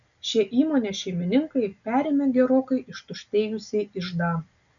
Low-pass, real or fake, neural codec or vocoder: 7.2 kHz; real; none